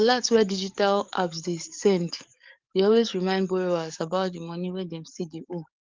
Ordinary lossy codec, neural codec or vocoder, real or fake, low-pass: Opus, 24 kbps; codec, 44.1 kHz, 7.8 kbps, DAC; fake; 7.2 kHz